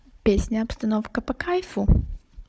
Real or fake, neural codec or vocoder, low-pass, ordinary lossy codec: fake; codec, 16 kHz, 16 kbps, FunCodec, trained on Chinese and English, 50 frames a second; none; none